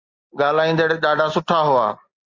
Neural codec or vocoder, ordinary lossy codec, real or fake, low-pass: none; Opus, 32 kbps; real; 7.2 kHz